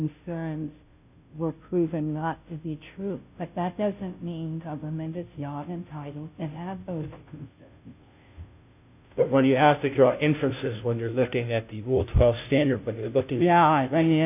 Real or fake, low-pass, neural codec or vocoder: fake; 3.6 kHz; codec, 16 kHz, 0.5 kbps, FunCodec, trained on Chinese and English, 25 frames a second